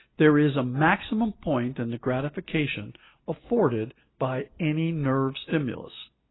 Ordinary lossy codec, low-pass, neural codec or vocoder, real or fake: AAC, 16 kbps; 7.2 kHz; none; real